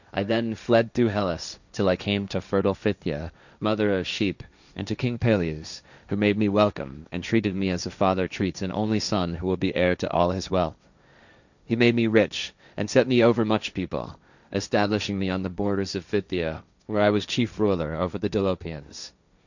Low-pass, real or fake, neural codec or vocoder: 7.2 kHz; fake; codec, 16 kHz, 1.1 kbps, Voila-Tokenizer